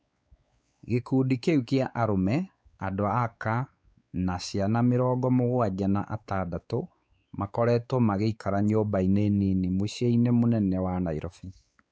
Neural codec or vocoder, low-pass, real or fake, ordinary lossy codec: codec, 16 kHz, 4 kbps, X-Codec, WavLM features, trained on Multilingual LibriSpeech; none; fake; none